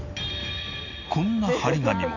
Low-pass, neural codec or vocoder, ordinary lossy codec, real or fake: 7.2 kHz; none; none; real